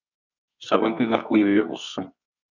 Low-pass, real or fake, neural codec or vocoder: 7.2 kHz; fake; codec, 24 kHz, 0.9 kbps, WavTokenizer, medium music audio release